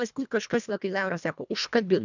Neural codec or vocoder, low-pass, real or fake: codec, 24 kHz, 1.5 kbps, HILCodec; 7.2 kHz; fake